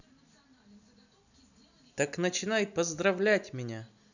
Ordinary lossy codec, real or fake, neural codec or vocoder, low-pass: none; real; none; 7.2 kHz